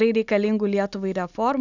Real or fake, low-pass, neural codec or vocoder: real; 7.2 kHz; none